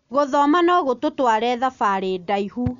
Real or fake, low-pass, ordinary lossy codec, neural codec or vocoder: real; 7.2 kHz; none; none